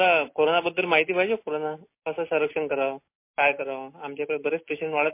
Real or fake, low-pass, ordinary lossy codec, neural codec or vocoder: real; 3.6 kHz; MP3, 24 kbps; none